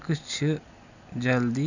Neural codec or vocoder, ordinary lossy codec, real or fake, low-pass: none; none; real; 7.2 kHz